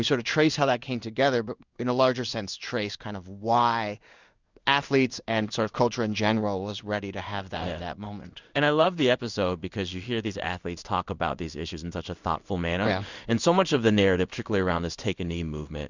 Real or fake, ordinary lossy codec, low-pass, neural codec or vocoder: fake; Opus, 64 kbps; 7.2 kHz; codec, 16 kHz in and 24 kHz out, 1 kbps, XY-Tokenizer